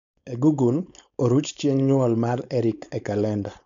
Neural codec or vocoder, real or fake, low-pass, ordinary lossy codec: codec, 16 kHz, 4.8 kbps, FACodec; fake; 7.2 kHz; none